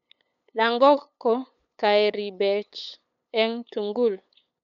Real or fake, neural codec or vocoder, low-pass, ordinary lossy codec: fake; codec, 16 kHz, 8 kbps, FunCodec, trained on LibriTTS, 25 frames a second; 7.2 kHz; none